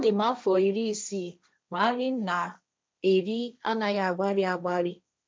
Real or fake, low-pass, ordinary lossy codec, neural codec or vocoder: fake; none; none; codec, 16 kHz, 1.1 kbps, Voila-Tokenizer